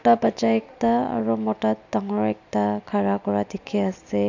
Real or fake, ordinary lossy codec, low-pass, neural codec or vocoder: real; none; 7.2 kHz; none